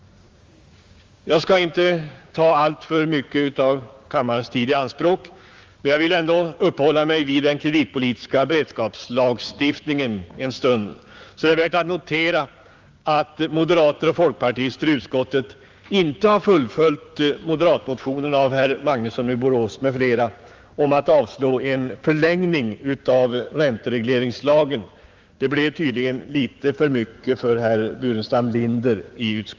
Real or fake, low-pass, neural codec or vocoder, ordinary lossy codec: fake; 7.2 kHz; codec, 16 kHz, 6 kbps, DAC; Opus, 32 kbps